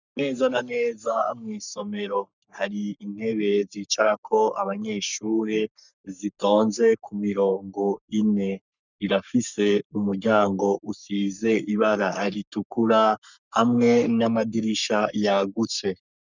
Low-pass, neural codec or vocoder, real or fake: 7.2 kHz; codec, 44.1 kHz, 3.4 kbps, Pupu-Codec; fake